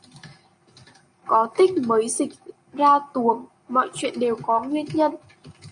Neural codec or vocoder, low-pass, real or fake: none; 9.9 kHz; real